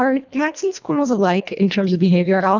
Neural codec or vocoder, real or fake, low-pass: codec, 24 kHz, 1.5 kbps, HILCodec; fake; 7.2 kHz